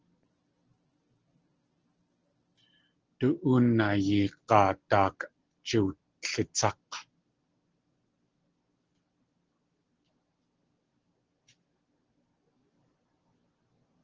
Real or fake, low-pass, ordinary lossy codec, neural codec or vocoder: real; 7.2 kHz; Opus, 16 kbps; none